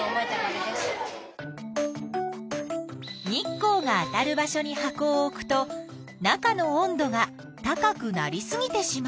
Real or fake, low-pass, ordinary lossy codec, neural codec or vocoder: real; none; none; none